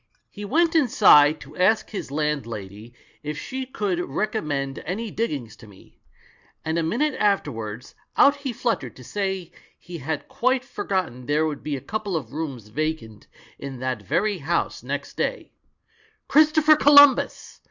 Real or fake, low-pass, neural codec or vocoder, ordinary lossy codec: real; 7.2 kHz; none; Opus, 64 kbps